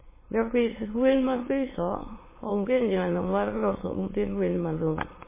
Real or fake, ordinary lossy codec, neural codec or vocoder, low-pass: fake; MP3, 16 kbps; autoencoder, 22.05 kHz, a latent of 192 numbers a frame, VITS, trained on many speakers; 3.6 kHz